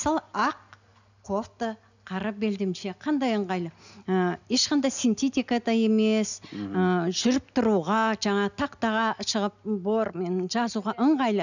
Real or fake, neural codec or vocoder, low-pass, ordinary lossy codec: real; none; 7.2 kHz; none